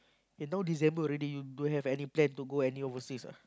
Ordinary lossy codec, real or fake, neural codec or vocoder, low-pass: none; real; none; none